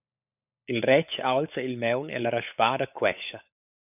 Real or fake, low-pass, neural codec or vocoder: fake; 3.6 kHz; codec, 16 kHz, 16 kbps, FunCodec, trained on LibriTTS, 50 frames a second